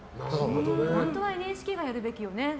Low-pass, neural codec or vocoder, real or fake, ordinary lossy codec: none; none; real; none